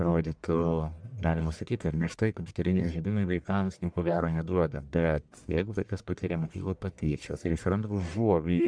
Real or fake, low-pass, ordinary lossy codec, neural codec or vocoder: fake; 9.9 kHz; MP3, 96 kbps; codec, 44.1 kHz, 1.7 kbps, Pupu-Codec